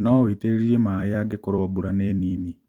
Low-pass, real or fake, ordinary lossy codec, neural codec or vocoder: 19.8 kHz; fake; Opus, 24 kbps; vocoder, 44.1 kHz, 128 mel bands every 256 samples, BigVGAN v2